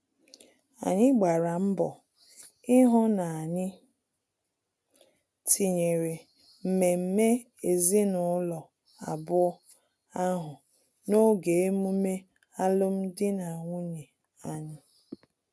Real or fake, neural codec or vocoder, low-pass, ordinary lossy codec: real; none; none; none